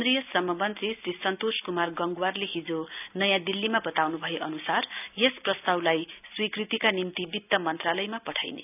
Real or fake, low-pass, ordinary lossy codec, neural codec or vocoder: real; 3.6 kHz; none; none